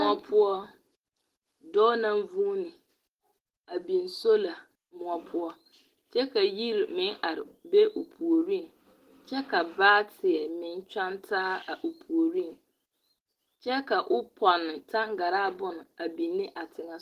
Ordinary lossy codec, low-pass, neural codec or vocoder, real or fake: Opus, 16 kbps; 14.4 kHz; none; real